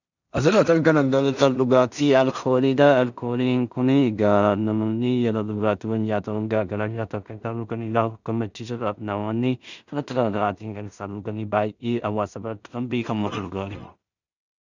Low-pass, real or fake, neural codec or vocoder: 7.2 kHz; fake; codec, 16 kHz in and 24 kHz out, 0.4 kbps, LongCat-Audio-Codec, two codebook decoder